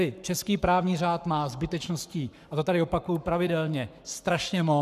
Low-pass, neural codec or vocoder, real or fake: 14.4 kHz; codec, 44.1 kHz, 7.8 kbps, Pupu-Codec; fake